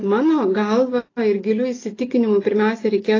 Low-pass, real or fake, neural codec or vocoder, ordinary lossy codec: 7.2 kHz; real; none; AAC, 32 kbps